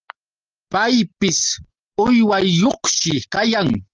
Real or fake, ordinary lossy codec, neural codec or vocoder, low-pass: real; Opus, 24 kbps; none; 7.2 kHz